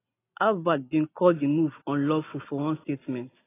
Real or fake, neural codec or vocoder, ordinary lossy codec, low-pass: real; none; AAC, 16 kbps; 3.6 kHz